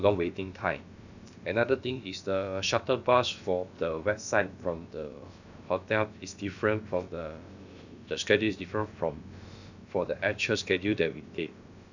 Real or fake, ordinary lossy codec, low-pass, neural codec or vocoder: fake; none; 7.2 kHz; codec, 16 kHz, about 1 kbps, DyCAST, with the encoder's durations